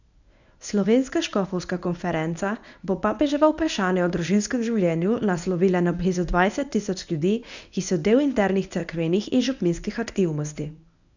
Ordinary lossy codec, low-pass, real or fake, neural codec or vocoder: none; 7.2 kHz; fake; codec, 24 kHz, 0.9 kbps, WavTokenizer, medium speech release version 1